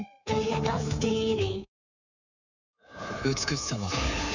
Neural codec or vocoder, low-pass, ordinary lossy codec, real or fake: codec, 24 kHz, 3.1 kbps, DualCodec; 7.2 kHz; none; fake